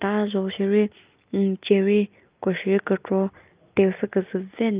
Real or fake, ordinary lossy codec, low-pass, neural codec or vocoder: real; Opus, 24 kbps; 3.6 kHz; none